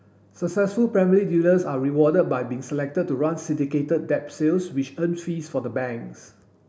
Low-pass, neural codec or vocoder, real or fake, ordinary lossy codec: none; none; real; none